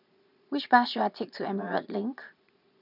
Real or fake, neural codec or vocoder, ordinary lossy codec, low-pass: fake; vocoder, 22.05 kHz, 80 mel bands, Vocos; none; 5.4 kHz